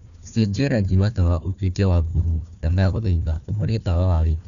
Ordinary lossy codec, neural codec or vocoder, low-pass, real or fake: none; codec, 16 kHz, 1 kbps, FunCodec, trained on Chinese and English, 50 frames a second; 7.2 kHz; fake